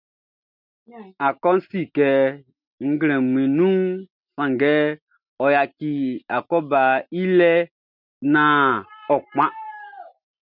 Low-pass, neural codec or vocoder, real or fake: 5.4 kHz; none; real